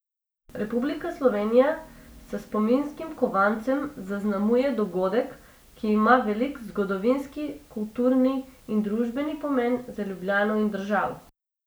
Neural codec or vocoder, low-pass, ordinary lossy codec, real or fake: none; none; none; real